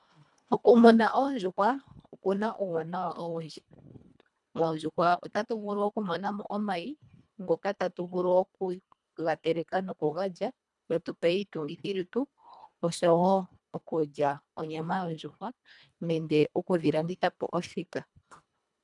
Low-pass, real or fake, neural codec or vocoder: 10.8 kHz; fake; codec, 24 kHz, 1.5 kbps, HILCodec